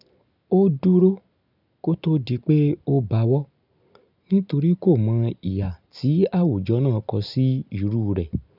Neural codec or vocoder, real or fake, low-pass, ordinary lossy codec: none; real; 5.4 kHz; none